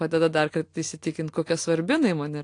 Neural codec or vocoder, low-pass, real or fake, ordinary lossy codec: none; 9.9 kHz; real; AAC, 48 kbps